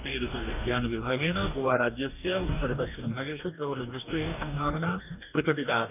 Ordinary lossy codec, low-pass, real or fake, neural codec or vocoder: none; 3.6 kHz; fake; codec, 44.1 kHz, 2.6 kbps, DAC